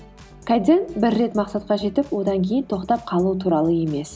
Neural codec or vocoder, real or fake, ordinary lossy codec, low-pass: none; real; none; none